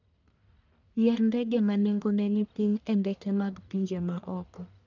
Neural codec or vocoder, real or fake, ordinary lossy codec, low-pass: codec, 44.1 kHz, 1.7 kbps, Pupu-Codec; fake; none; 7.2 kHz